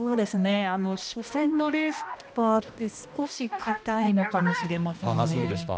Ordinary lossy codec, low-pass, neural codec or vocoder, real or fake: none; none; codec, 16 kHz, 1 kbps, X-Codec, HuBERT features, trained on balanced general audio; fake